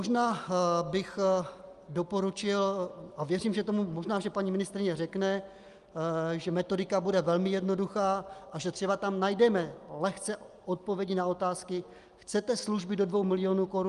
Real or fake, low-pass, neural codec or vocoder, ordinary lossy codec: real; 10.8 kHz; none; Opus, 32 kbps